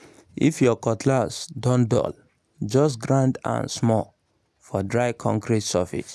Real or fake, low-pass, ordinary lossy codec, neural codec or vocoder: real; none; none; none